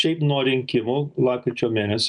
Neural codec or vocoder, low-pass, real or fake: none; 9.9 kHz; real